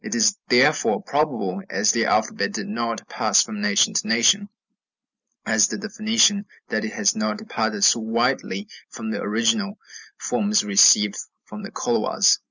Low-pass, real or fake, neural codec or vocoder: 7.2 kHz; real; none